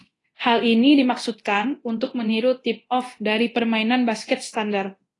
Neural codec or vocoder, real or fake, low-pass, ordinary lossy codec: codec, 24 kHz, 0.9 kbps, DualCodec; fake; 10.8 kHz; AAC, 32 kbps